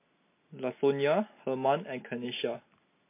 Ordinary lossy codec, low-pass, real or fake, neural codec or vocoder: MP3, 24 kbps; 3.6 kHz; real; none